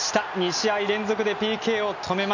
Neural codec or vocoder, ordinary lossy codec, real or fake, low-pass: none; none; real; 7.2 kHz